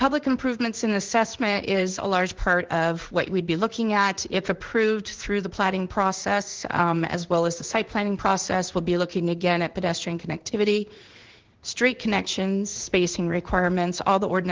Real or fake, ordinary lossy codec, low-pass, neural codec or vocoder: fake; Opus, 16 kbps; 7.2 kHz; codec, 16 kHz in and 24 kHz out, 1 kbps, XY-Tokenizer